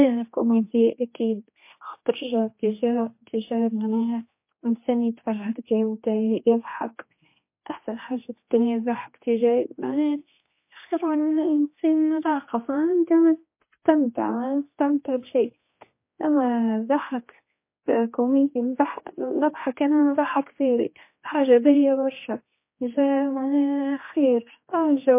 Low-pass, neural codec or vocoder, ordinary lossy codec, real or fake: 3.6 kHz; codec, 16 kHz, 2 kbps, X-Codec, HuBERT features, trained on general audio; MP3, 24 kbps; fake